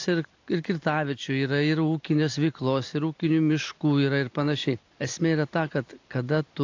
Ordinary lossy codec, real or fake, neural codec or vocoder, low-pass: AAC, 48 kbps; real; none; 7.2 kHz